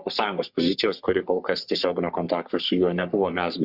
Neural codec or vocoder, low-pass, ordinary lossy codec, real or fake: codec, 44.1 kHz, 3.4 kbps, Pupu-Codec; 5.4 kHz; Opus, 64 kbps; fake